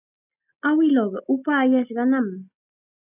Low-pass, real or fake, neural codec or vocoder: 3.6 kHz; real; none